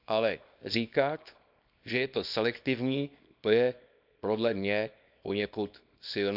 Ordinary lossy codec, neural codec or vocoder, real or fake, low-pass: none; codec, 24 kHz, 0.9 kbps, WavTokenizer, small release; fake; 5.4 kHz